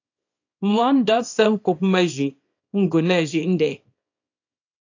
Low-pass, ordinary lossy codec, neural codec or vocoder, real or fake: 7.2 kHz; AAC, 48 kbps; codec, 24 kHz, 0.9 kbps, WavTokenizer, small release; fake